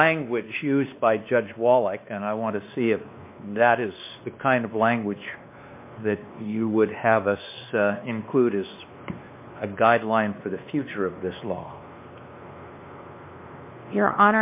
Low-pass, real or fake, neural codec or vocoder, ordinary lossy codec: 3.6 kHz; fake; codec, 16 kHz, 2 kbps, X-Codec, WavLM features, trained on Multilingual LibriSpeech; MP3, 24 kbps